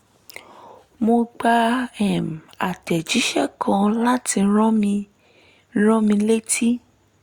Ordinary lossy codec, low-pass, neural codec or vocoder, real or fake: Opus, 64 kbps; 19.8 kHz; none; real